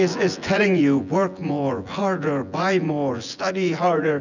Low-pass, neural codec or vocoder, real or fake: 7.2 kHz; vocoder, 24 kHz, 100 mel bands, Vocos; fake